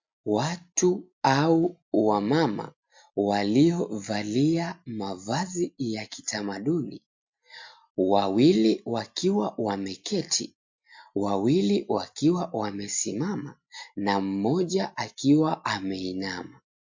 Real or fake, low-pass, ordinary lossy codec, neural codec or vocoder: real; 7.2 kHz; MP3, 48 kbps; none